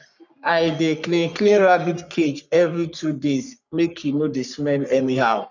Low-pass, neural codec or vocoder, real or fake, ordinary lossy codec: 7.2 kHz; codec, 44.1 kHz, 3.4 kbps, Pupu-Codec; fake; none